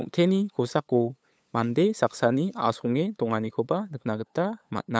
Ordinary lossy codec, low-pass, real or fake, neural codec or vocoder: none; none; fake; codec, 16 kHz, 8 kbps, FunCodec, trained on LibriTTS, 25 frames a second